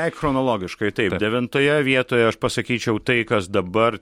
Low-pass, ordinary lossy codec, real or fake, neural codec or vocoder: 19.8 kHz; MP3, 64 kbps; real; none